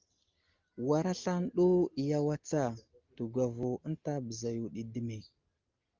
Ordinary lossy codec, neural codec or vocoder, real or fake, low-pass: Opus, 32 kbps; none; real; 7.2 kHz